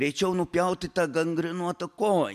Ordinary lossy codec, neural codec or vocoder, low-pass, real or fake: MP3, 96 kbps; none; 14.4 kHz; real